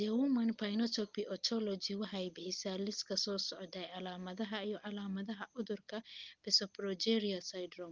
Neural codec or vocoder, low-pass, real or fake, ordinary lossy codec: none; 7.2 kHz; real; Opus, 24 kbps